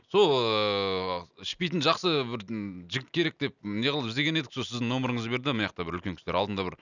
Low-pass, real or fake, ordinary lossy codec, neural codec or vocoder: 7.2 kHz; real; none; none